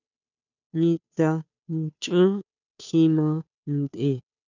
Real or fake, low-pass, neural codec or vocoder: fake; 7.2 kHz; codec, 16 kHz, 2 kbps, FunCodec, trained on Chinese and English, 25 frames a second